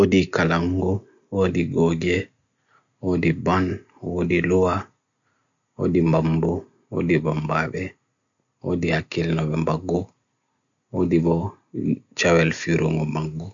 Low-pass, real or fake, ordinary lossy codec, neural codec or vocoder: 7.2 kHz; real; none; none